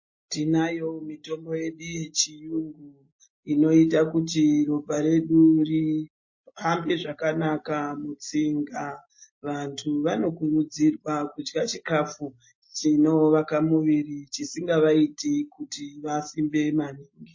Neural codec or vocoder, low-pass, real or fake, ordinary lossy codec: none; 7.2 kHz; real; MP3, 32 kbps